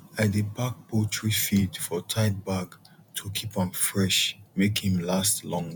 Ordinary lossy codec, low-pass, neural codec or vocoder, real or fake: none; none; vocoder, 48 kHz, 128 mel bands, Vocos; fake